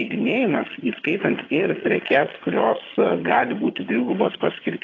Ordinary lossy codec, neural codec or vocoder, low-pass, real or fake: AAC, 32 kbps; vocoder, 22.05 kHz, 80 mel bands, HiFi-GAN; 7.2 kHz; fake